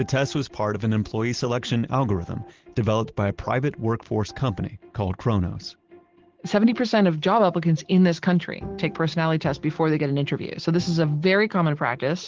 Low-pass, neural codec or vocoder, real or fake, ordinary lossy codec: 7.2 kHz; none; real; Opus, 16 kbps